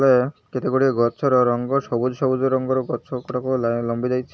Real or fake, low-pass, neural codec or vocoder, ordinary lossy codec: real; none; none; none